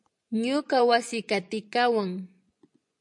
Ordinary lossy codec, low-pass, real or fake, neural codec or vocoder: MP3, 64 kbps; 10.8 kHz; fake; vocoder, 24 kHz, 100 mel bands, Vocos